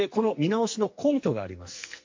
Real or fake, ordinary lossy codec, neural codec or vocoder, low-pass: fake; MP3, 48 kbps; codec, 44.1 kHz, 2.6 kbps, SNAC; 7.2 kHz